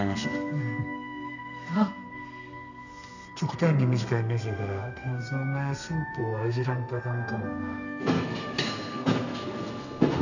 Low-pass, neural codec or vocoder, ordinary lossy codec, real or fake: 7.2 kHz; codec, 32 kHz, 1.9 kbps, SNAC; none; fake